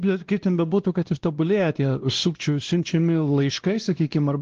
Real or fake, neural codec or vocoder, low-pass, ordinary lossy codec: fake; codec, 16 kHz, 1 kbps, X-Codec, WavLM features, trained on Multilingual LibriSpeech; 7.2 kHz; Opus, 16 kbps